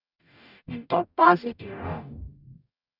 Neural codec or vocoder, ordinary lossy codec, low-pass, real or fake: codec, 44.1 kHz, 0.9 kbps, DAC; none; 5.4 kHz; fake